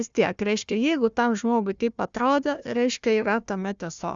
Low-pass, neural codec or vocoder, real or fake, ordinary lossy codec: 7.2 kHz; codec, 16 kHz, 1 kbps, FunCodec, trained on Chinese and English, 50 frames a second; fake; Opus, 64 kbps